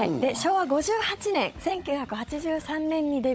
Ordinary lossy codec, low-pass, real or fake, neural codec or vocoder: none; none; fake; codec, 16 kHz, 16 kbps, FunCodec, trained on Chinese and English, 50 frames a second